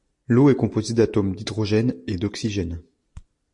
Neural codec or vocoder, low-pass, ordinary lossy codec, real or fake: none; 10.8 kHz; MP3, 48 kbps; real